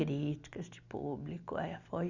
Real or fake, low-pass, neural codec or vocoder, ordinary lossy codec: real; 7.2 kHz; none; Opus, 64 kbps